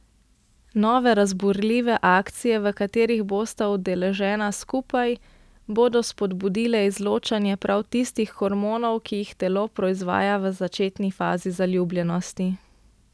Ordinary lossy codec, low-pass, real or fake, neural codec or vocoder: none; none; real; none